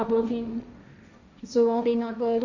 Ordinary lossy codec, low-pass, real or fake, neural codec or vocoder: none; 7.2 kHz; fake; codec, 16 kHz, 1.1 kbps, Voila-Tokenizer